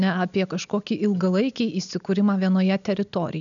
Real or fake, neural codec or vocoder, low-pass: real; none; 7.2 kHz